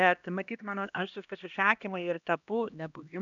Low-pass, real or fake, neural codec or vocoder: 7.2 kHz; fake; codec, 16 kHz, 1 kbps, X-Codec, HuBERT features, trained on LibriSpeech